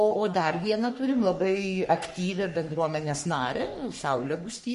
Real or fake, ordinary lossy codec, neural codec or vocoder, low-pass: fake; MP3, 48 kbps; codec, 44.1 kHz, 3.4 kbps, Pupu-Codec; 14.4 kHz